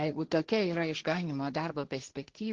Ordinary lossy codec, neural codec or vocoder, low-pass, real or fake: Opus, 16 kbps; codec, 16 kHz, 1.1 kbps, Voila-Tokenizer; 7.2 kHz; fake